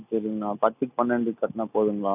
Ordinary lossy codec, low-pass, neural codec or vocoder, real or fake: none; 3.6 kHz; none; real